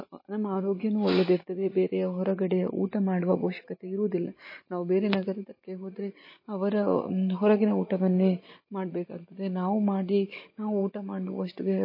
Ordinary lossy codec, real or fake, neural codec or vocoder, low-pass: MP3, 24 kbps; real; none; 5.4 kHz